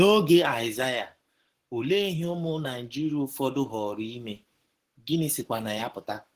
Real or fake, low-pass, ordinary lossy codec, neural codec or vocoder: fake; 14.4 kHz; Opus, 16 kbps; codec, 44.1 kHz, 7.8 kbps, Pupu-Codec